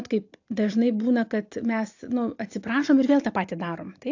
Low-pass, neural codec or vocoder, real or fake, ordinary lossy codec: 7.2 kHz; none; real; AAC, 48 kbps